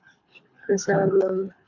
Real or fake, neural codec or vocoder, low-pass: fake; codec, 24 kHz, 3 kbps, HILCodec; 7.2 kHz